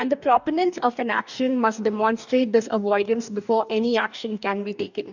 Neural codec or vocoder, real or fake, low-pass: codec, 44.1 kHz, 2.6 kbps, DAC; fake; 7.2 kHz